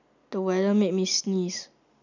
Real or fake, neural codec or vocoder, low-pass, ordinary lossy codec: real; none; 7.2 kHz; none